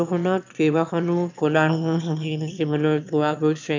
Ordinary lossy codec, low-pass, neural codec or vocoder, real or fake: MP3, 64 kbps; 7.2 kHz; autoencoder, 22.05 kHz, a latent of 192 numbers a frame, VITS, trained on one speaker; fake